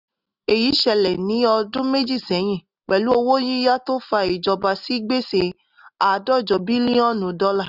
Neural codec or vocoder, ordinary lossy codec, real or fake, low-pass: none; none; real; 5.4 kHz